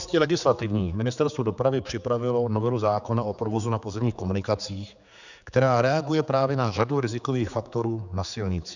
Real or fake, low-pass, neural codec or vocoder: fake; 7.2 kHz; codec, 16 kHz, 4 kbps, X-Codec, HuBERT features, trained on general audio